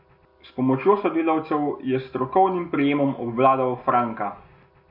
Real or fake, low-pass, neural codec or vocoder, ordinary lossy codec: real; 5.4 kHz; none; MP3, 32 kbps